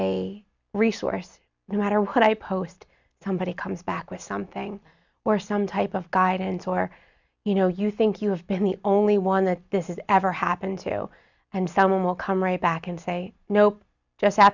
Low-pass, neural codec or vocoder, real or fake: 7.2 kHz; none; real